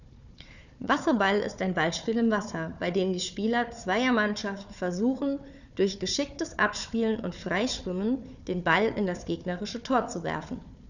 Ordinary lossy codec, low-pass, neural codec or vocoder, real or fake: none; 7.2 kHz; codec, 16 kHz, 4 kbps, FunCodec, trained on Chinese and English, 50 frames a second; fake